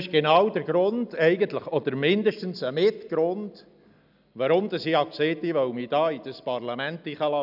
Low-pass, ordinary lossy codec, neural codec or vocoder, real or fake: 5.4 kHz; none; none; real